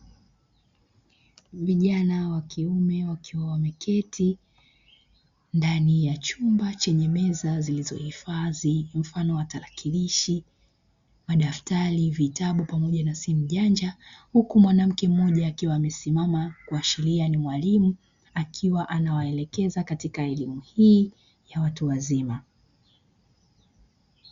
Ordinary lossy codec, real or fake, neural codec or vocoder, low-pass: Opus, 64 kbps; real; none; 7.2 kHz